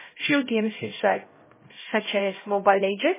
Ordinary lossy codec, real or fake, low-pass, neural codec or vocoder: MP3, 16 kbps; fake; 3.6 kHz; codec, 16 kHz, 0.5 kbps, X-Codec, HuBERT features, trained on LibriSpeech